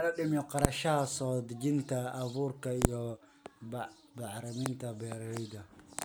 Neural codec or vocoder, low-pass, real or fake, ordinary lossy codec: none; none; real; none